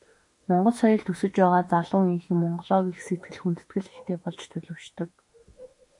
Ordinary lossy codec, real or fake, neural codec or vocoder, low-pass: MP3, 48 kbps; fake; autoencoder, 48 kHz, 32 numbers a frame, DAC-VAE, trained on Japanese speech; 10.8 kHz